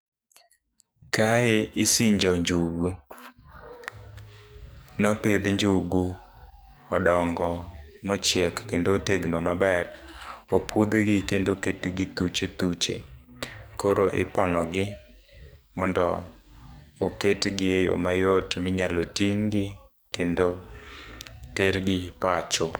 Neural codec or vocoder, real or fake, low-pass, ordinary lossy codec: codec, 44.1 kHz, 2.6 kbps, SNAC; fake; none; none